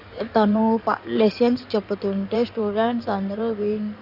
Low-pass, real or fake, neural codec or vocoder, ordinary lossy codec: 5.4 kHz; fake; codec, 16 kHz in and 24 kHz out, 2.2 kbps, FireRedTTS-2 codec; none